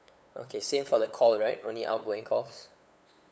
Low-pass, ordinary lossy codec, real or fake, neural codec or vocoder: none; none; fake; codec, 16 kHz, 8 kbps, FunCodec, trained on LibriTTS, 25 frames a second